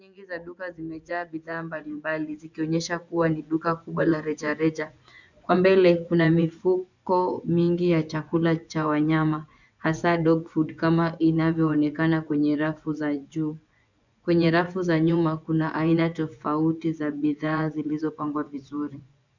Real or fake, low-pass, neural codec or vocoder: fake; 7.2 kHz; vocoder, 24 kHz, 100 mel bands, Vocos